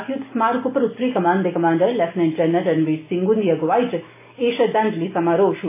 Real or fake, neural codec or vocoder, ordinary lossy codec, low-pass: real; none; AAC, 24 kbps; 3.6 kHz